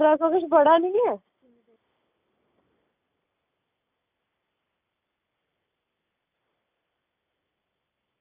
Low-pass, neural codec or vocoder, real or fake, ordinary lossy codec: 3.6 kHz; none; real; none